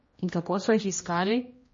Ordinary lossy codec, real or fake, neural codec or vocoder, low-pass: MP3, 32 kbps; fake; codec, 16 kHz, 1 kbps, X-Codec, HuBERT features, trained on general audio; 7.2 kHz